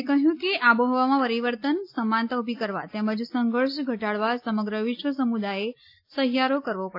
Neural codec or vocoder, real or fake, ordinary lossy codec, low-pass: none; real; AAC, 32 kbps; 5.4 kHz